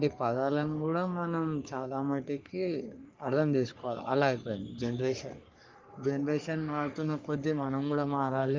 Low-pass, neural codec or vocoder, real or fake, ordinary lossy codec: 7.2 kHz; codec, 44.1 kHz, 3.4 kbps, Pupu-Codec; fake; Opus, 32 kbps